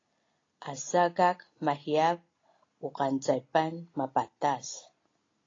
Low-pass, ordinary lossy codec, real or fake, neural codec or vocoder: 7.2 kHz; AAC, 32 kbps; real; none